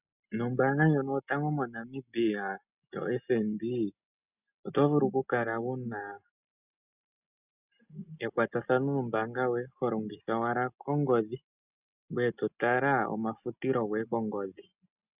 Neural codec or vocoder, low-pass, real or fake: none; 3.6 kHz; real